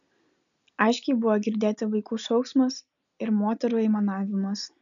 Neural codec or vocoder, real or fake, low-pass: none; real; 7.2 kHz